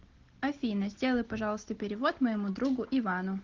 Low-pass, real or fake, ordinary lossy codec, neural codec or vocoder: 7.2 kHz; real; Opus, 16 kbps; none